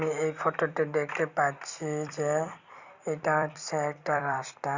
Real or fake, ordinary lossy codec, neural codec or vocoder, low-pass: fake; Opus, 64 kbps; vocoder, 44.1 kHz, 128 mel bands every 256 samples, BigVGAN v2; 7.2 kHz